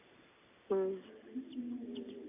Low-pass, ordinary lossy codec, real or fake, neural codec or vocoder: 3.6 kHz; AAC, 24 kbps; real; none